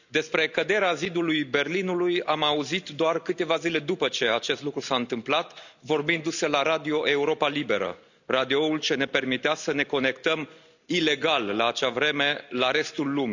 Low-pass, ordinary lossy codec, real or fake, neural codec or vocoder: 7.2 kHz; none; real; none